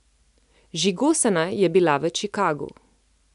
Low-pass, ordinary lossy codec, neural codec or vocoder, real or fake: 10.8 kHz; MP3, 96 kbps; none; real